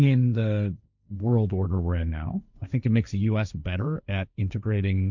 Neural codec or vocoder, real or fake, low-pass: codec, 16 kHz, 1.1 kbps, Voila-Tokenizer; fake; 7.2 kHz